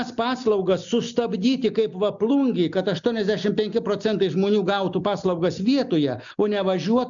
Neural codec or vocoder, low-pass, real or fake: none; 7.2 kHz; real